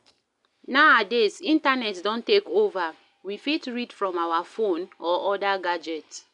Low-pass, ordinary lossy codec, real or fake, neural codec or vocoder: 10.8 kHz; none; real; none